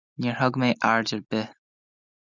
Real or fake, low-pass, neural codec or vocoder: real; 7.2 kHz; none